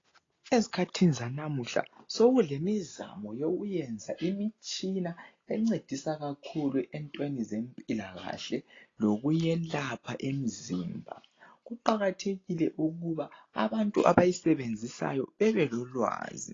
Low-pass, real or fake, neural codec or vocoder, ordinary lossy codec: 7.2 kHz; real; none; AAC, 32 kbps